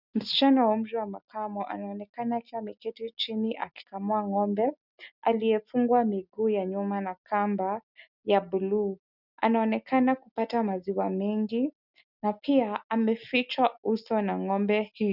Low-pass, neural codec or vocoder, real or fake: 5.4 kHz; none; real